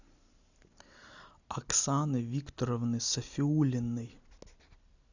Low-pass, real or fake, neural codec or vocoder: 7.2 kHz; real; none